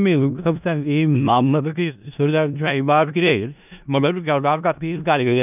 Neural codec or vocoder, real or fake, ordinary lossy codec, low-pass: codec, 16 kHz in and 24 kHz out, 0.4 kbps, LongCat-Audio-Codec, four codebook decoder; fake; none; 3.6 kHz